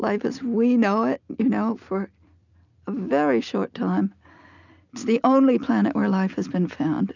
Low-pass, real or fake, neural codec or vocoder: 7.2 kHz; real; none